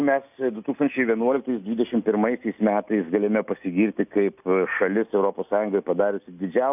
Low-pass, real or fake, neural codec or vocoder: 3.6 kHz; real; none